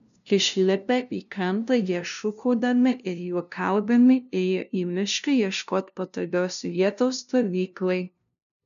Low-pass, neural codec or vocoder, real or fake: 7.2 kHz; codec, 16 kHz, 0.5 kbps, FunCodec, trained on LibriTTS, 25 frames a second; fake